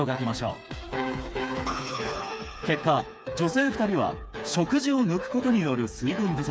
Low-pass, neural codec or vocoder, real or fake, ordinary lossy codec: none; codec, 16 kHz, 4 kbps, FreqCodec, smaller model; fake; none